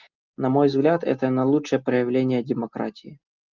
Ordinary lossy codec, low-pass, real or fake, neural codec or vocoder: Opus, 24 kbps; 7.2 kHz; real; none